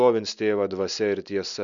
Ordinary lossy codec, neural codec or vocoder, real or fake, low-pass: MP3, 96 kbps; none; real; 7.2 kHz